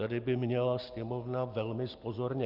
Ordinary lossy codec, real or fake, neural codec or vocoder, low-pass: Opus, 24 kbps; real; none; 5.4 kHz